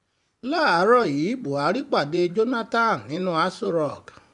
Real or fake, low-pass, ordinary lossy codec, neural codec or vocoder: fake; 10.8 kHz; none; vocoder, 24 kHz, 100 mel bands, Vocos